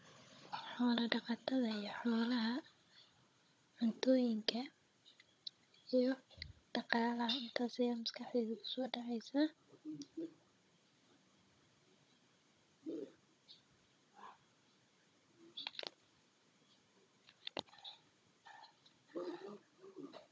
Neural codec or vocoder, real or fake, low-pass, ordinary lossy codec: codec, 16 kHz, 16 kbps, FunCodec, trained on Chinese and English, 50 frames a second; fake; none; none